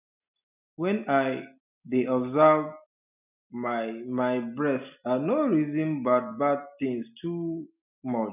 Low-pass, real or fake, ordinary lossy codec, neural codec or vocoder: 3.6 kHz; real; none; none